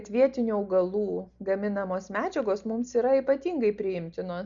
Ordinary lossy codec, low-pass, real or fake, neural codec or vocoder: Opus, 64 kbps; 7.2 kHz; real; none